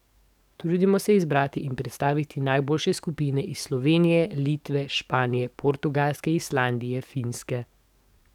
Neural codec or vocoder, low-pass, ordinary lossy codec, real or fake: codec, 44.1 kHz, 7.8 kbps, DAC; 19.8 kHz; none; fake